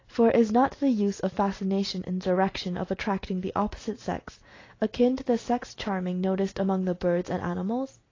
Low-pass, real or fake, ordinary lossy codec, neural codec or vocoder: 7.2 kHz; real; AAC, 32 kbps; none